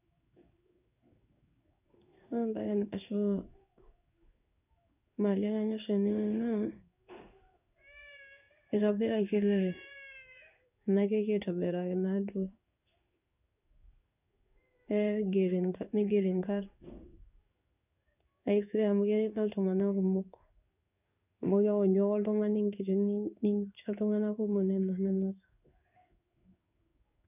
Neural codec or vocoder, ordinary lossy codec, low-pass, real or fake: codec, 16 kHz in and 24 kHz out, 1 kbps, XY-Tokenizer; none; 3.6 kHz; fake